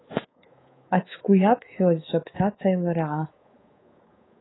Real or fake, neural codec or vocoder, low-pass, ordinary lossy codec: fake; codec, 24 kHz, 3.1 kbps, DualCodec; 7.2 kHz; AAC, 16 kbps